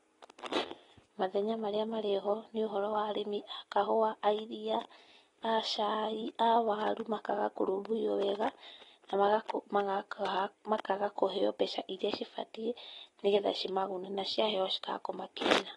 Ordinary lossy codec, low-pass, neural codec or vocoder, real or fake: AAC, 32 kbps; 10.8 kHz; none; real